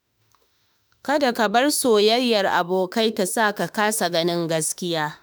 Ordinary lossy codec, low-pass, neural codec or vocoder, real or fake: none; none; autoencoder, 48 kHz, 32 numbers a frame, DAC-VAE, trained on Japanese speech; fake